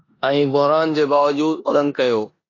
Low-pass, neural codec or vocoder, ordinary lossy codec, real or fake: 7.2 kHz; codec, 16 kHz in and 24 kHz out, 0.9 kbps, LongCat-Audio-Codec, four codebook decoder; AAC, 32 kbps; fake